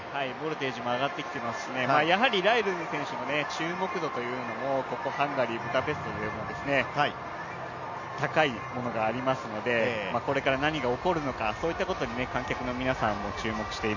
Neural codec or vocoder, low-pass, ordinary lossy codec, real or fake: none; 7.2 kHz; none; real